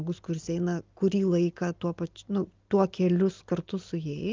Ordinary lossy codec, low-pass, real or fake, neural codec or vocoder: Opus, 32 kbps; 7.2 kHz; real; none